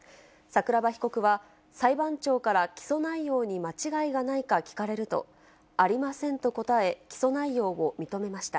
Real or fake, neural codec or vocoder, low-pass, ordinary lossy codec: real; none; none; none